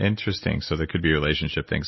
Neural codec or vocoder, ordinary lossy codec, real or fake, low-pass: none; MP3, 24 kbps; real; 7.2 kHz